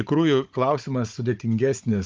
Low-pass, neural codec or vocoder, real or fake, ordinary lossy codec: 7.2 kHz; codec, 16 kHz, 16 kbps, FunCodec, trained on Chinese and English, 50 frames a second; fake; Opus, 24 kbps